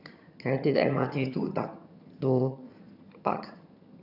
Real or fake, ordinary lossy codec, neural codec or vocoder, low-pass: fake; none; vocoder, 22.05 kHz, 80 mel bands, HiFi-GAN; 5.4 kHz